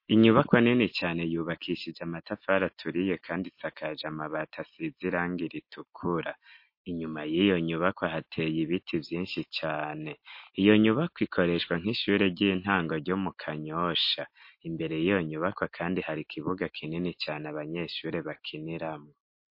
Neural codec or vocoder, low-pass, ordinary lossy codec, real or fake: none; 5.4 kHz; MP3, 32 kbps; real